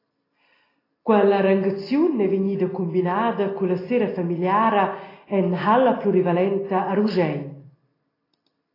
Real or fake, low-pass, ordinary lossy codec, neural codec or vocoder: real; 5.4 kHz; AAC, 24 kbps; none